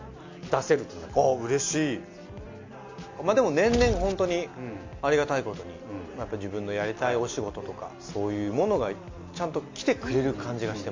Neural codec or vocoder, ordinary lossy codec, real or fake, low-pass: none; MP3, 64 kbps; real; 7.2 kHz